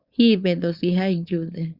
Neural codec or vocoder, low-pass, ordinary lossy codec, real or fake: codec, 16 kHz, 4.8 kbps, FACodec; 5.4 kHz; Opus, 64 kbps; fake